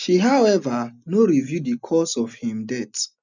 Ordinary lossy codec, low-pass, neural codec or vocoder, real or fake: none; 7.2 kHz; none; real